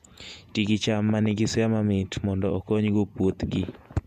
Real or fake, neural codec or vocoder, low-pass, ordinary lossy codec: fake; vocoder, 48 kHz, 128 mel bands, Vocos; 14.4 kHz; MP3, 96 kbps